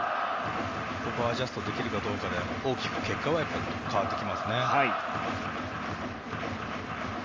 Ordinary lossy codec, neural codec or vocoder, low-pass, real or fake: Opus, 32 kbps; none; 7.2 kHz; real